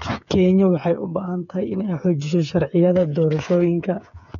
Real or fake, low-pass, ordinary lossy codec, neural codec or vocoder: fake; 7.2 kHz; none; codec, 16 kHz, 8 kbps, FreqCodec, smaller model